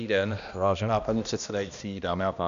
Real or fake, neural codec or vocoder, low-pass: fake; codec, 16 kHz, 1 kbps, X-Codec, HuBERT features, trained on balanced general audio; 7.2 kHz